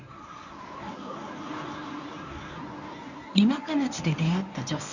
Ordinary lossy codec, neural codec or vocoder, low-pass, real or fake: none; codec, 24 kHz, 0.9 kbps, WavTokenizer, medium speech release version 1; 7.2 kHz; fake